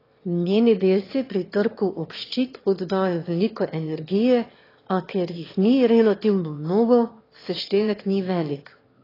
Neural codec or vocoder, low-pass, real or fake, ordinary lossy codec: autoencoder, 22.05 kHz, a latent of 192 numbers a frame, VITS, trained on one speaker; 5.4 kHz; fake; AAC, 24 kbps